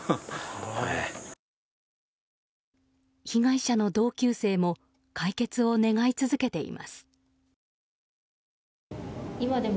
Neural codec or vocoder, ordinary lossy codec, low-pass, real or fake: none; none; none; real